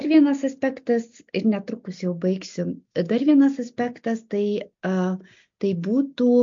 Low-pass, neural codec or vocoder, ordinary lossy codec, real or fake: 7.2 kHz; none; MP3, 48 kbps; real